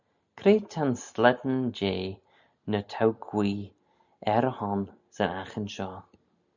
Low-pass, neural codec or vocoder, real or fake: 7.2 kHz; none; real